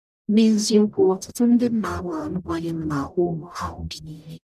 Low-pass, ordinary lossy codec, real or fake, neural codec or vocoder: 14.4 kHz; none; fake; codec, 44.1 kHz, 0.9 kbps, DAC